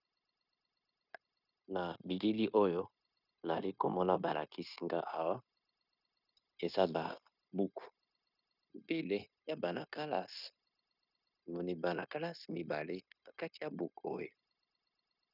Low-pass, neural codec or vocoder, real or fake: 5.4 kHz; codec, 16 kHz, 0.9 kbps, LongCat-Audio-Codec; fake